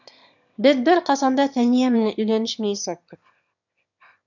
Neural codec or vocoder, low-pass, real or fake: autoencoder, 22.05 kHz, a latent of 192 numbers a frame, VITS, trained on one speaker; 7.2 kHz; fake